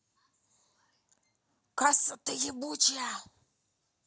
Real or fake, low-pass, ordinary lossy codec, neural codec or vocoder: real; none; none; none